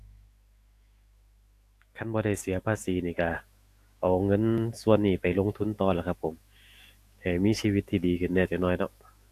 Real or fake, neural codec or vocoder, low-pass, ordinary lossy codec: fake; autoencoder, 48 kHz, 128 numbers a frame, DAC-VAE, trained on Japanese speech; 14.4 kHz; AAC, 64 kbps